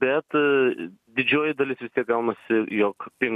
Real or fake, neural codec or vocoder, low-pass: real; none; 14.4 kHz